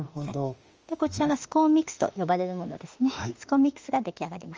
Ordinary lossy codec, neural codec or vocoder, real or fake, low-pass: Opus, 24 kbps; autoencoder, 48 kHz, 32 numbers a frame, DAC-VAE, trained on Japanese speech; fake; 7.2 kHz